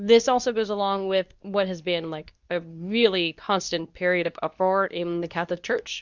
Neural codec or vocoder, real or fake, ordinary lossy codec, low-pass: codec, 24 kHz, 0.9 kbps, WavTokenizer, medium speech release version 2; fake; Opus, 64 kbps; 7.2 kHz